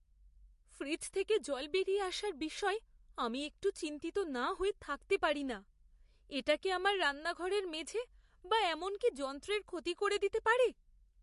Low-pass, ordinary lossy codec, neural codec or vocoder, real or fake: 14.4 kHz; MP3, 48 kbps; none; real